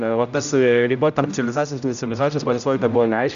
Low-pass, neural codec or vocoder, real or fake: 7.2 kHz; codec, 16 kHz, 0.5 kbps, X-Codec, HuBERT features, trained on general audio; fake